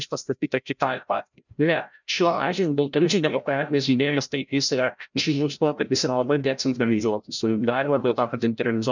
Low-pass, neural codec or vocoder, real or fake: 7.2 kHz; codec, 16 kHz, 0.5 kbps, FreqCodec, larger model; fake